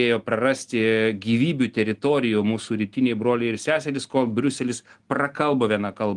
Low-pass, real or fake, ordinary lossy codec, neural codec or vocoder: 10.8 kHz; real; Opus, 24 kbps; none